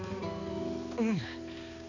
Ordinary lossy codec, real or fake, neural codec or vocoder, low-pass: none; fake; codec, 16 kHz, 4 kbps, X-Codec, HuBERT features, trained on balanced general audio; 7.2 kHz